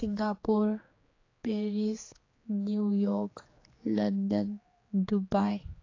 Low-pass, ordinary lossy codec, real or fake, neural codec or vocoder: 7.2 kHz; AAC, 32 kbps; fake; codec, 16 kHz, 4 kbps, X-Codec, HuBERT features, trained on general audio